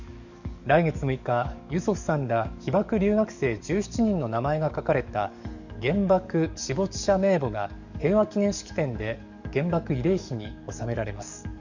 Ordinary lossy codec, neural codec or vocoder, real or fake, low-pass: none; codec, 44.1 kHz, 7.8 kbps, DAC; fake; 7.2 kHz